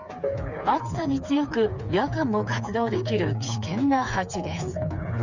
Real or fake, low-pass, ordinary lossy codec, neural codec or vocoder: fake; 7.2 kHz; none; codec, 16 kHz, 4 kbps, FreqCodec, smaller model